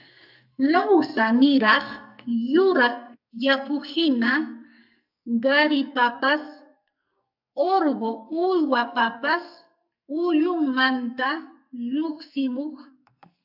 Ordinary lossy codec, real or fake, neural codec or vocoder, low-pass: AAC, 48 kbps; fake; codec, 44.1 kHz, 2.6 kbps, SNAC; 5.4 kHz